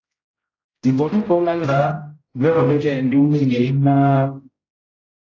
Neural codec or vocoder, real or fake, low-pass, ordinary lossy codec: codec, 16 kHz, 0.5 kbps, X-Codec, HuBERT features, trained on general audio; fake; 7.2 kHz; AAC, 32 kbps